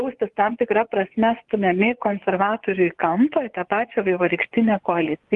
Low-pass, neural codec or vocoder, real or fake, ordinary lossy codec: 10.8 kHz; vocoder, 24 kHz, 100 mel bands, Vocos; fake; Opus, 24 kbps